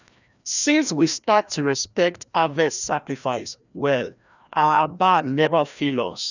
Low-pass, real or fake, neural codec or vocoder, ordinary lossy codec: 7.2 kHz; fake; codec, 16 kHz, 1 kbps, FreqCodec, larger model; none